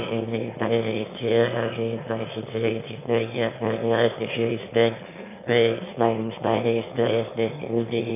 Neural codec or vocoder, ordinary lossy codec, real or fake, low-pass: autoencoder, 22.05 kHz, a latent of 192 numbers a frame, VITS, trained on one speaker; AAC, 32 kbps; fake; 3.6 kHz